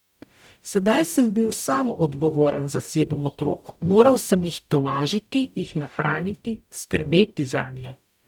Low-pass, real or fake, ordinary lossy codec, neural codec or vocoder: 19.8 kHz; fake; none; codec, 44.1 kHz, 0.9 kbps, DAC